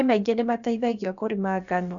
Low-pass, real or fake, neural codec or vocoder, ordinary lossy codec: 7.2 kHz; fake; codec, 16 kHz, about 1 kbps, DyCAST, with the encoder's durations; none